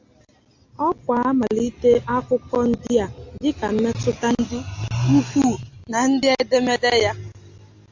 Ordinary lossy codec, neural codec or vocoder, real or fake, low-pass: AAC, 48 kbps; none; real; 7.2 kHz